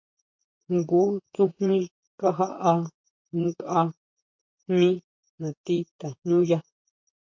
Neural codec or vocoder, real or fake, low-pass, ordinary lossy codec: vocoder, 44.1 kHz, 128 mel bands, Pupu-Vocoder; fake; 7.2 kHz; MP3, 48 kbps